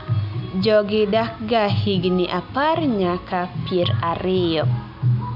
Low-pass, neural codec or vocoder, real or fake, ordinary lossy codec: 5.4 kHz; none; real; none